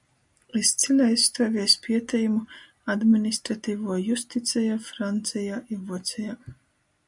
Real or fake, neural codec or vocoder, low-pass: real; none; 10.8 kHz